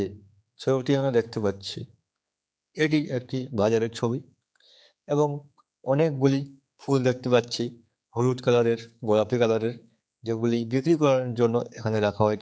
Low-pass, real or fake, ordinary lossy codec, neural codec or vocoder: none; fake; none; codec, 16 kHz, 4 kbps, X-Codec, HuBERT features, trained on general audio